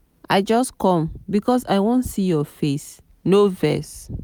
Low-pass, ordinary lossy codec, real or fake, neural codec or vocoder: none; none; real; none